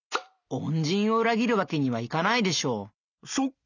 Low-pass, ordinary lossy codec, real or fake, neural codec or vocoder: 7.2 kHz; none; real; none